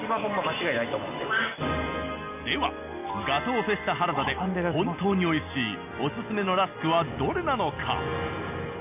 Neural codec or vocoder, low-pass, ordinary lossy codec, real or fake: none; 3.6 kHz; none; real